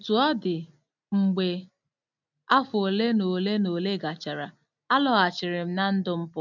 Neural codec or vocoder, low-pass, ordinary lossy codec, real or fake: none; 7.2 kHz; none; real